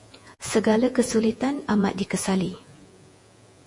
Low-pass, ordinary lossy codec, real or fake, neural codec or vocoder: 10.8 kHz; MP3, 48 kbps; fake; vocoder, 48 kHz, 128 mel bands, Vocos